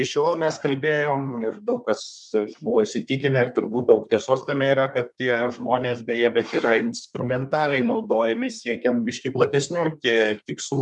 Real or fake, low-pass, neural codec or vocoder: fake; 10.8 kHz; codec, 24 kHz, 1 kbps, SNAC